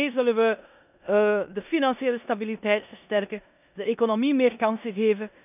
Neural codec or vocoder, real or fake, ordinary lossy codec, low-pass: codec, 16 kHz in and 24 kHz out, 0.9 kbps, LongCat-Audio-Codec, four codebook decoder; fake; none; 3.6 kHz